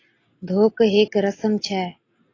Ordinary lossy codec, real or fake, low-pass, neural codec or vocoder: AAC, 32 kbps; real; 7.2 kHz; none